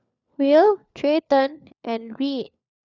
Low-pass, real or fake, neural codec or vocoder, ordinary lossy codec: 7.2 kHz; fake; codec, 16 kHz, 4 kbps, FunCodec, trained on LibriTTS, 50 frames a second; none